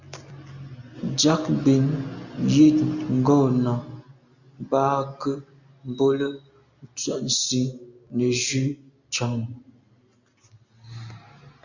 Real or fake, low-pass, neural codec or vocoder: real; 7.2 kHz; none